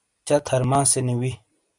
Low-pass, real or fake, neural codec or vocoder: 10.8 kHz; real; none